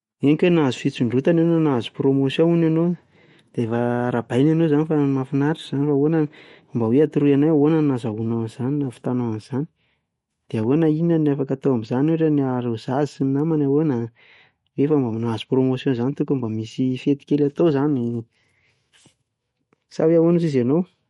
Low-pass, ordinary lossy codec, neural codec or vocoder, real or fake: 19.8 kHz; MP3, 48 kbps; autoencoder, 48 kHz, 128 numbers a frame, DAC-VAE, trained on Japanese speech; fake